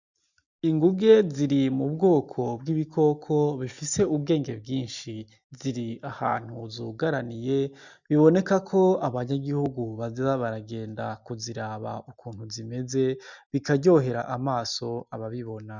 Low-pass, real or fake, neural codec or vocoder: 7.2 kHz; real; none